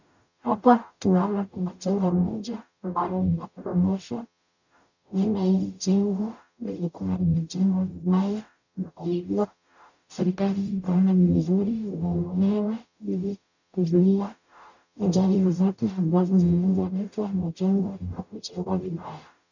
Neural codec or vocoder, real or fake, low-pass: codec, 44.1 kHz, 0.9 kbps, DAC; fake; 7.2 kHz